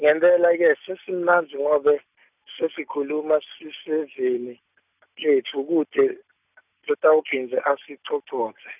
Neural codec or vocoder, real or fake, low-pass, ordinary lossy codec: none; real; 3.6 kHz; none